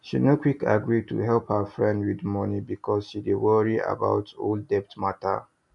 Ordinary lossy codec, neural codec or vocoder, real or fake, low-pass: none; none; real; 10.8 kHz